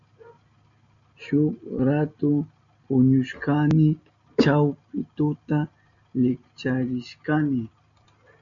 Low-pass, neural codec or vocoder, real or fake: 7.2 kHz; none; real